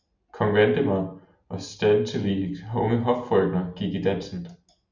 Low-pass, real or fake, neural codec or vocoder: 7.2 kHz; real; none